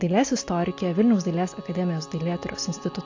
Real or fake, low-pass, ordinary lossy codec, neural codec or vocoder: real; 7.2 kHz; AAC, 48 kbps; none